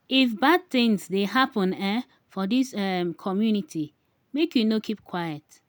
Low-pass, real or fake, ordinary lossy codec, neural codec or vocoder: none; real; none; none